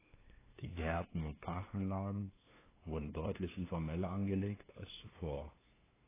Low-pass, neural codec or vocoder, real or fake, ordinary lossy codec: 3.6 kHz; codec, 16 kHz, 2 kbps, FunCodec, trained on LibriTTS, 25 frames a second; fake; AAC, 16 kbps